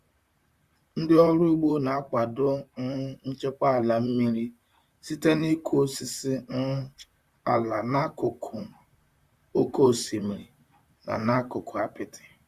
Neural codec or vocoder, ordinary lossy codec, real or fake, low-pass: vocoder, 44.1 kHz, 128 mel bands, Pupu-Vocoder; Opus, 64 kbps; fake; 14.4 kHz